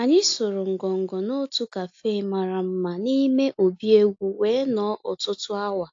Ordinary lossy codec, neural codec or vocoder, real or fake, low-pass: AAC, 48 kbps; none; real; 7.2 kHz